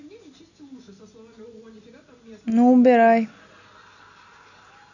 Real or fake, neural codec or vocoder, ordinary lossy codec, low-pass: real; none; none; 7.2 kHz